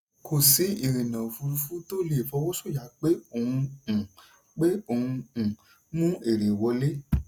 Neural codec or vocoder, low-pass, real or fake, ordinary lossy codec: none; none; real; none